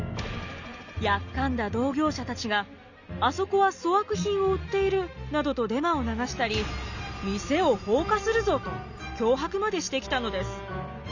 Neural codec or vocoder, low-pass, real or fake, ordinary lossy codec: none; 7.2 kHz; real; none